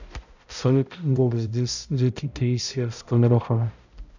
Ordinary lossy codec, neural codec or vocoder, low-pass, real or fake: none; codec, 16 kHz, 0.5 kbps, X-Codec, HuBERT features, trained on balanced general audio; 7.2 kHz; fake